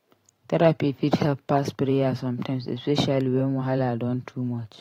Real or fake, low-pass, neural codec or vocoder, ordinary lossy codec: fake; 19.8 kHz; vocoder, 44.1 kHz, 128 mel bands every 512 samples, BigVGAN v2; AAC, 48 kbps